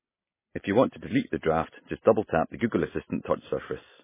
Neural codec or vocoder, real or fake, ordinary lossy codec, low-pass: none; real; MP3, 16 kbps; 3.6 kHz